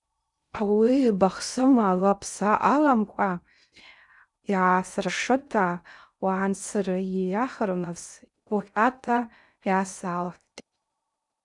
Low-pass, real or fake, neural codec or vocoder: 10.8 kHz; fake; codec, 16 kHz in and 24 kHz out, 0.6 kbps, FocalCodec, streaming, 2048 codes